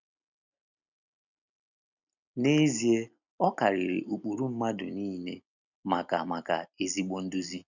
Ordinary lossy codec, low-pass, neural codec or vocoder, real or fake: none; 7.2 kHz; none; real